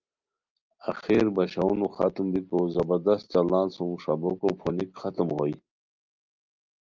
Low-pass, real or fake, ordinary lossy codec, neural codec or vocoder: 7.2 kHz; real; Opus, 24 kbps; none